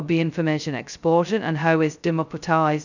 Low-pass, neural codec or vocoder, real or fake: 7.2 kHz; codec, 16 kHz, 0.2 kbps, FocalCodec; fake